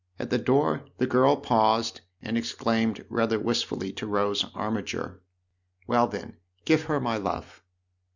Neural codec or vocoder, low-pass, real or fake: none; 7.2 kHz; real